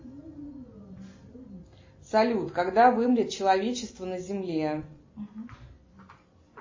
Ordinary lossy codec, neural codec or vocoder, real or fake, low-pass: MP3, 32 kbps; none; real; 7.2 kHz